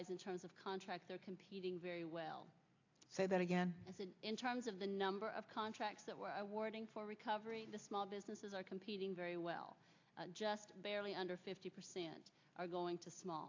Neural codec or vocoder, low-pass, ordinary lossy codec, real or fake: none; 7.2 kHz; AAC, 48 kbps; real